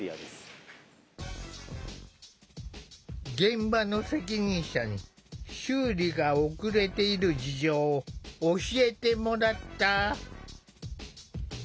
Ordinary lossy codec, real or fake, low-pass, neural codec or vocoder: none; real; none; none